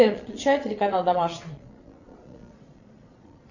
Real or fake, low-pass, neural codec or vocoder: fake; 7.2 kHz; vocoder, 22.05 kHz, 80 mel bands, Vocos